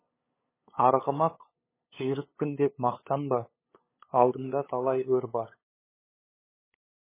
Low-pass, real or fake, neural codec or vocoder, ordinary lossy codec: 3.6 kHz; fake; codec, 16 kHz, 8 kbps, FunCodec, trained on LibriTTS, 25 frames a second; MP3, 16 kbps